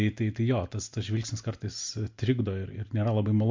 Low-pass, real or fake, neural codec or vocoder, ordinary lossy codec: 7.2 kHz; real; none; MP3, 48 kbps